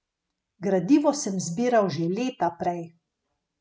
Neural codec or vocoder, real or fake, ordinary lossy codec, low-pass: none; real; none; none